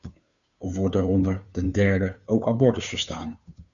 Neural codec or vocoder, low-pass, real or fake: codec, 16 kHz, 2 kbps, FunCodec, trained on Chinese and English, 25 frames a second; 7.2 kHz; fake